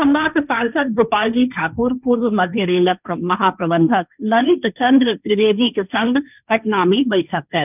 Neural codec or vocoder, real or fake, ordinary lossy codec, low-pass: codec, 16 kHz, 1.1 kbps, Voila-Tokenizer; fake; none; 3.6 kHz